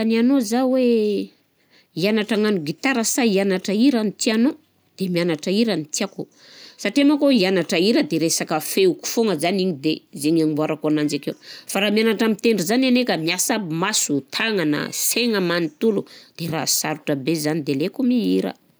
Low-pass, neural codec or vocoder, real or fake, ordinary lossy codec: none; none; real; none